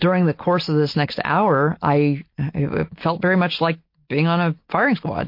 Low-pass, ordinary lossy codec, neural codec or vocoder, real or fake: 5.4 kHz; MP3, 32 kbps; none; real